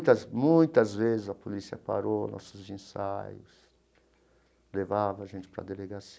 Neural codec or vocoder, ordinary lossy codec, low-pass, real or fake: none; none; none; real